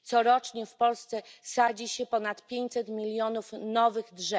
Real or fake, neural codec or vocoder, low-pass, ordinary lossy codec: real; none; none; none